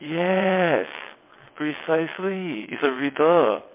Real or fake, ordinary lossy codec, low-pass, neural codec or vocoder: fake; MP3, 24 kbps; 3.6 kHz; vocoder, 22.05 kHz, 80 mel bands, WaveNeXt